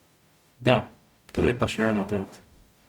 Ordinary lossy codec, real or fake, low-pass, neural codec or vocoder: Opus, 64 kbps; fake; 19.8 kHz; codec, 44.1 kHz, 0.9 kbps, DAC